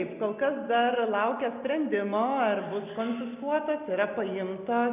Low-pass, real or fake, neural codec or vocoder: 3.6 kHz; real; none